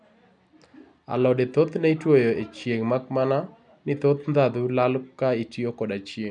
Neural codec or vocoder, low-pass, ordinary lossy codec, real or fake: none; 10.8 kHz; none; real